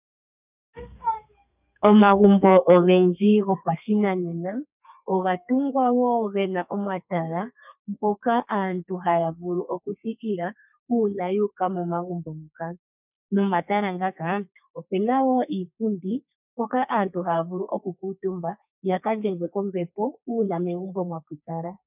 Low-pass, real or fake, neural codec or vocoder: 3.6 kHz; fake; codec, 32 kHz, 1.9 kbps, SNAC